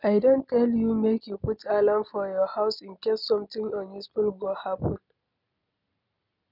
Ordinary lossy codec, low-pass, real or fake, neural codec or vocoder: none; 5.4 kHz; real; none